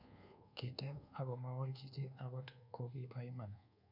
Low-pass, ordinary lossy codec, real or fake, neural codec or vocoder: 5.4 kHz; none; fake; codec, 24 kHz, 1.2 kbps, DualCodec